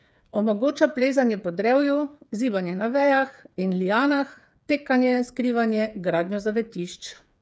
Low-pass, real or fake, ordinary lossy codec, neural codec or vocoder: none; fake; none; codec, 16 kHz, 8 kbps, FreqCodec, smaller model